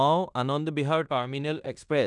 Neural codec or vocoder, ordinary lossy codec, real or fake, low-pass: codec, 16 kHz in and 24 kHz out, 0.9 kbps, LongCat-Audio-Codec, fine tuned four codebook decoder; none; fake; 10.8 kHz